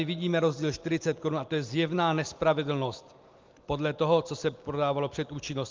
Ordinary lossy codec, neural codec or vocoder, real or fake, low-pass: Opus, 32 kbps; none; real; 7.2 kHz